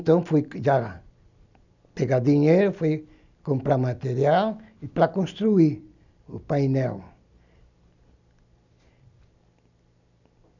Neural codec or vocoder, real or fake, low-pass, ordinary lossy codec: none; real; 7.2 kHz; none